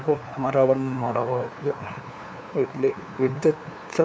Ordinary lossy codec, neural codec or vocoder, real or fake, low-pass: none; codec, 16 kHz, 2 kbps, FunCodec, trained on LibriTTS, 25 frames a second; fake; none